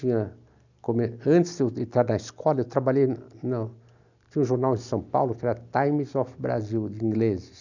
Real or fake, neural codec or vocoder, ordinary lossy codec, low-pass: real; none; none; 7.2 kHz